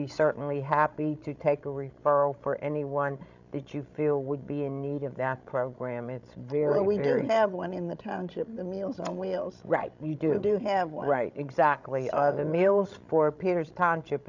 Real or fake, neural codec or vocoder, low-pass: fake; codec, 16 kHz, 16 kbps, FreqCodec, larger model; 7.2 kHz